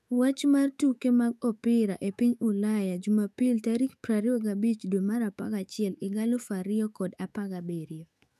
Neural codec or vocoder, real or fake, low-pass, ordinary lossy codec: autoencoder, 48 kHz, 128 numbers a frame, DAC-VAE, trained on Japanese speech; fake; 14.4 kHz; none